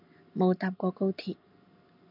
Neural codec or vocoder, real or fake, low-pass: none; real; 5.4 kHz